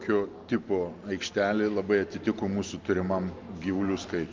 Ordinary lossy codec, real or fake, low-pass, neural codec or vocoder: Opus, 32 kbps; real; 7.2 kHz; none